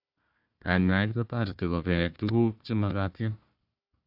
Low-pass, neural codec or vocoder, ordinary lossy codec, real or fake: 5.4 kHz; codec, 16 kHz, 1 kbps, FunCodec, trained on Chinese and English, 50 frames a second; AAC, 48 kbps; fake